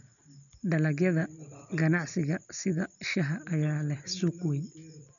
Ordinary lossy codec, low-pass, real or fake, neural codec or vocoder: none; 7.2 kHz; real; none